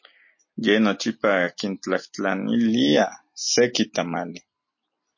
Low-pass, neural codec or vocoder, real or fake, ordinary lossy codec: 7.2 kHz; none; real; MP3, 32 kbps